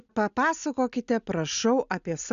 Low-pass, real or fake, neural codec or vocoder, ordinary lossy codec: 7.2 kHz; real; none; AAC, 96 kbps